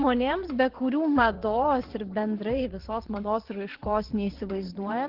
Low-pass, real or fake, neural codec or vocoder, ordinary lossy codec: 5.4 kHz; fake; vocoder, 22.05 kHz, 80 mel bands, WaveNeXt; Opus, 16 kbps